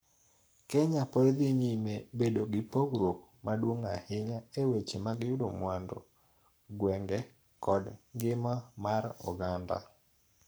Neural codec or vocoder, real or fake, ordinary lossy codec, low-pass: codec, 44.1 kHz, 7.8 kbps, Pupu-Codec; fake; none; none